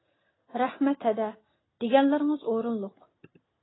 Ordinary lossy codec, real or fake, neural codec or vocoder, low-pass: AAC, 16 kbps; real; none; 7.2 kHz